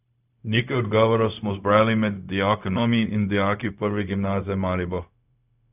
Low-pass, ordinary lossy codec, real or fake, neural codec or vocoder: 3.6 kHz; none; fake; codec, 16 kHz, 0.4 kbps, LongCat-Audio-Codec